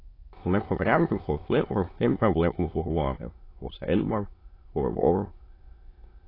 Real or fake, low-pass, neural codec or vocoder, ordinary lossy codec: fake; 5.4 kHz; autoencoder, 22.05 kHz, a latent of 192 numbers a frame, VITS, trained on many speakers; AAC, 24 kbps